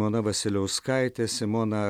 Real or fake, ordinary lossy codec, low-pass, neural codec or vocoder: real; MP3, 96 kbps; 19.8 kHz; none